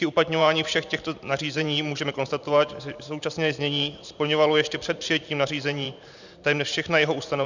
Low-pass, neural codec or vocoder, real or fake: 7.2 kHz; vocoder, 44.1 kHz, 128 mel bands every 512 samples, BigVGAN v2; fake